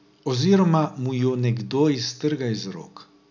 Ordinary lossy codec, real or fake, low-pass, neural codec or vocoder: none; real; 7.2 kHz; none